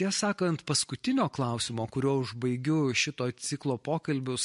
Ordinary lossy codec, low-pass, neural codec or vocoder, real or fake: MP3, 48 kbps; 14.4 kHz; none; real